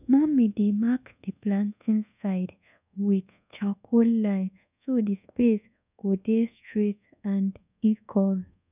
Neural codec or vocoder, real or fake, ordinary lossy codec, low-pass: codec, 24 kHz, 1.2 kbps, DualCodec; fake; none; 3.6 kHz